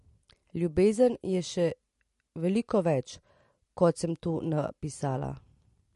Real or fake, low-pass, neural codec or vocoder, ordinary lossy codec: real; 14.4 kHz; none; MP3, 48 kbps